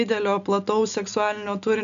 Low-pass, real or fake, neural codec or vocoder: 7.2 kHz; real; none